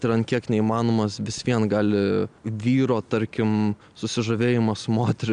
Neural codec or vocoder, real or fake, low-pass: none; real; 9.9 kHz